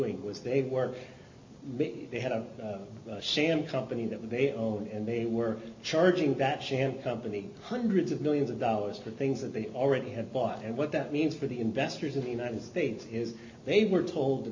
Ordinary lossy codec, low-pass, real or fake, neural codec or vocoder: MP3, 48 kbps; 7.2 kHz; real; none